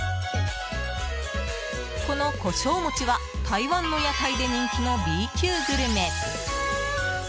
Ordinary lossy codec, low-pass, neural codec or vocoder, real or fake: none; none; none; real